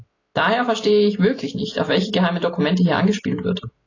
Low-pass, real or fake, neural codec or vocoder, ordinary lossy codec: 7.2 kHz; real; none; AAC, 32 kbps